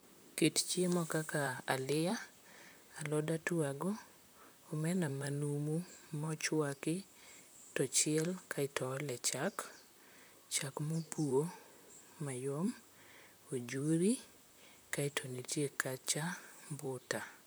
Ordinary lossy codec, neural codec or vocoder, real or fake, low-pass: none; vocoder, 44.1 kHz, 128 mel bands, Pupu-Vocoder; fake; none